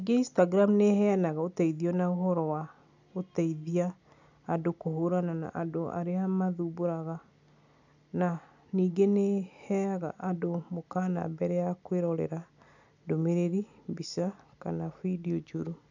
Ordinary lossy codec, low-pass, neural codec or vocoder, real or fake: none; 7.2 kHz; none; real